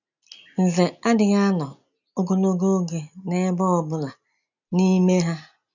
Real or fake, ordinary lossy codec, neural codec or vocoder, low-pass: real; none; none; 7.2 kHz